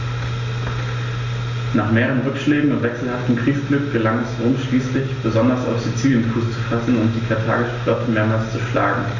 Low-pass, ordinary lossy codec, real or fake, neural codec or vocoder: 7.2 kHz; none; real; none